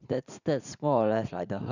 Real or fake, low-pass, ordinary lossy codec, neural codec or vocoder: fake; 7.2 kHz; none; vocoder, 44.1 kHz, 80 mel bands, Vocos